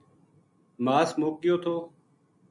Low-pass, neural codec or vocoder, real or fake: 10.8 kHz; none; real